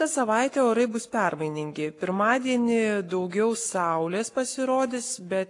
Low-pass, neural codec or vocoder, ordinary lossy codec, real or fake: 10.8 kHz; codec, 44.1 kHz, 7.8 kbps, Pupu-Codec; AAC, 48 kbps; fake